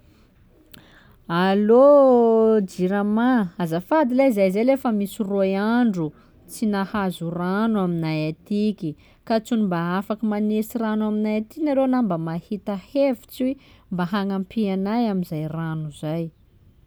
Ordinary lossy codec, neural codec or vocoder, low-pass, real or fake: none; none; none; real